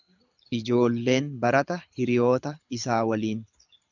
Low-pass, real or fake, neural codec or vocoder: 7.2 kHz; fake; codec, 24 kHz, 6 kbps, HILCodec